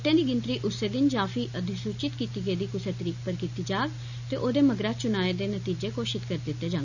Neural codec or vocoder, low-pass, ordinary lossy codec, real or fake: none; 7.2 kHz; none; real